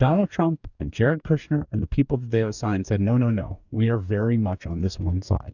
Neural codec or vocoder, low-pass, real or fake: codec, 44.1 kHz, 2.6 kbps, DAC; 7.2 kHz; fake